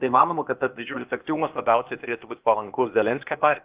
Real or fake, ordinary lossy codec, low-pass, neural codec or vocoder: fake; Opus, 24 kbps; 3.6 kHz; codec, 16 kHz, 0.8 kbps, ZipCodec